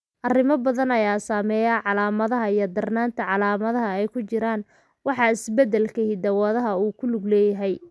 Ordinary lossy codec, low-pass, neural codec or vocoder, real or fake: none; none; none; real